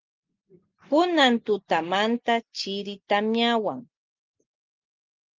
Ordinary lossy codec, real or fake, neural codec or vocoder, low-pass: Opus, 32 kbps; real; none; 7.2 kHz